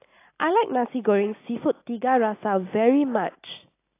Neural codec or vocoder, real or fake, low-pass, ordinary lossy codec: none; real; 3.6 kHz; AAC, 24 kbps